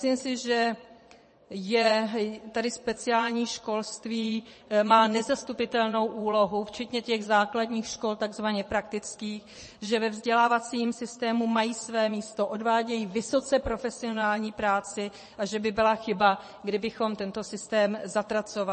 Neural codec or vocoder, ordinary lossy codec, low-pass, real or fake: vocoder, 22.05 kHz, 80 mel bands, Vocos; MP3, 32 kbps; 9.9 kHz; fake